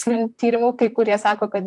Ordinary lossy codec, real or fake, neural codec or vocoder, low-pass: AAC, 64 kbps; fake; vocoder, 44.1 kHz, 128 mel bands, Pupu-Vocoder; 10.8 kHz